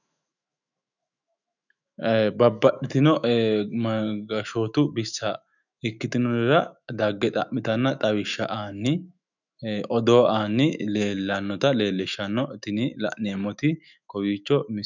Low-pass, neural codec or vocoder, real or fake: 7.2 kHz; autoencoder, 48 kHz, 128 numbers a frame, DAC-VAE, trained on Japanese speech; fake